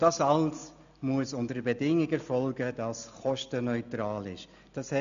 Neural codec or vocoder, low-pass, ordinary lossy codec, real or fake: none; 7.2 kHz; none; real